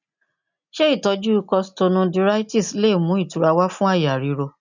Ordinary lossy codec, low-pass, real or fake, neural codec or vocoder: none; 7.2 kHz; real; none